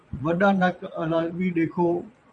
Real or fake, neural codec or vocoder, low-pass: fake; vocoder, 22.05 kHz, 80 mel bands, Vocos; 9.9 kHz